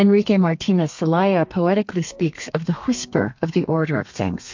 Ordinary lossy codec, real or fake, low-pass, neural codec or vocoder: MP3, 48 kbps; fake; 7.2 kHz; codec, 32 kHz, 1.9 kbps, SNAC